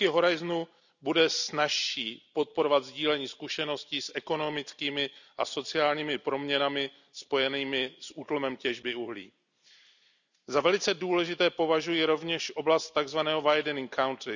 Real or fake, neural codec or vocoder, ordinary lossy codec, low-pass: real; none; none; 7.2 kHz